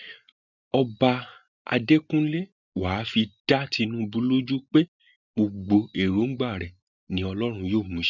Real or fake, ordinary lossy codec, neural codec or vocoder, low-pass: real; none; none; 7.2 kHz